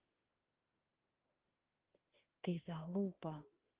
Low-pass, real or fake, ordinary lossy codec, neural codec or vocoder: 3.6 kHz; fake; Opus, 16 kbps; autoencoder, 48 kHz, 32 numbers a frame, DAC-VAE, trained on Japanese speech